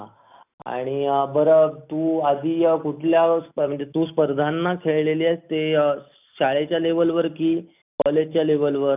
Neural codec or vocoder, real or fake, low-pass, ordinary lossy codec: none; real; 3.6 kHz; none